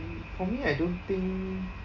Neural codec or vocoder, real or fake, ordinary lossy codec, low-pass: none; real; none; 7.2 kHz